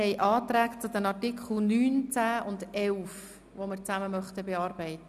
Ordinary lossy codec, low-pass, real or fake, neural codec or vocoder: none; 14.4 kHz; real; none